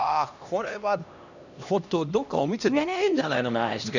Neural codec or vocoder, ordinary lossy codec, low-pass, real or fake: codec, 16 kHz, 1 kbps, X-Codec, HuBERT features, trained on LibriSpeech; none; 7.2 kHz; fake